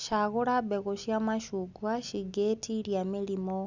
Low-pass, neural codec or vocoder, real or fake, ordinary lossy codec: 7.2 kHz; none; real; none